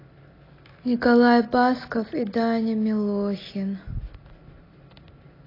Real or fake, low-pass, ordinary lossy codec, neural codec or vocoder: real; 5.4 kHz; AAC, 24 kbps; none